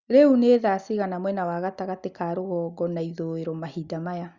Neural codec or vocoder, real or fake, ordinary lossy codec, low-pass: none; real; Opus, 64 kbps; 7.2 kHz